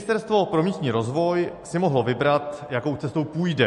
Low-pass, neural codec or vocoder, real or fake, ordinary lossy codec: 14.4 kHz; none; real; MP3, 48 kbps